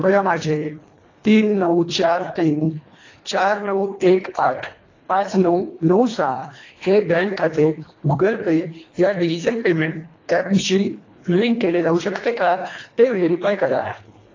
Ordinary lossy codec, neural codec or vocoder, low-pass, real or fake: AAC, 32 kbps; codec, 24 kHz, 1.5 kbps, HILCodec; 7.2 kHz; fake